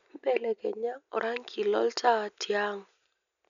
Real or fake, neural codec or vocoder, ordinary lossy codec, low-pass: real; none; none; 7.2 kHz